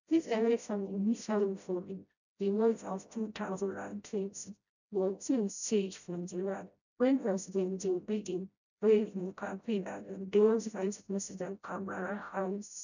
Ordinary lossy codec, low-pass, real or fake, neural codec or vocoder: none; 7.2 kHz; fake; codec, 16 kHz, 0.5 kbps, FreqCodec, smaller model